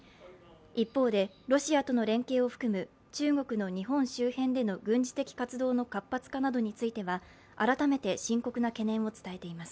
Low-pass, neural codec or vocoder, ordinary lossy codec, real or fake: none; none; none; real